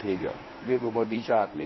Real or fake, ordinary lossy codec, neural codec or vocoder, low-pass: fake; MP3, 24 kbps; codec, 24 kHz, 0.9 kbps, WavTokenizer, medium speech release version 1; 7.2 kHz